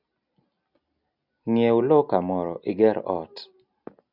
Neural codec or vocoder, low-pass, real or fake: none; 5.4 kHz; real